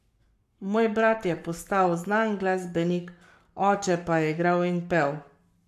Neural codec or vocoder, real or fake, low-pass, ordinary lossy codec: codec, 44.1 kHz, 7.8 kbps, Pupu-Codec; fake; 14.4 kHz; none